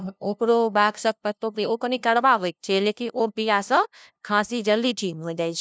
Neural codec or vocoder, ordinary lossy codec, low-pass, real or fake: codec, 16 kHz, 0.5 kbps, FunCodec, trained on LibriTTS, 25 frames a second; none; none; fake